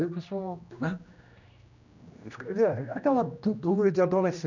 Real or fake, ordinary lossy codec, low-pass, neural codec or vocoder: fake; none; 7.2 kHz; codec, 16 kHz, 1 kbps, X-Codec, HuBERT features, trained on general audio